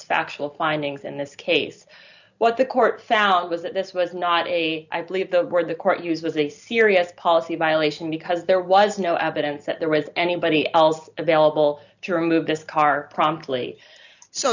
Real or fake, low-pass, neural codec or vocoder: real; 7.2 kHz; none